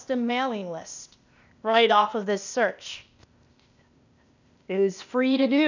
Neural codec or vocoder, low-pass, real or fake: codec, 16 kHz, 0.8 kbps, ZipCodec; 7.2 kHz; fake